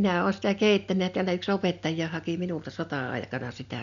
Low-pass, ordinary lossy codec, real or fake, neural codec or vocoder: 7.2 kHz; none; real; none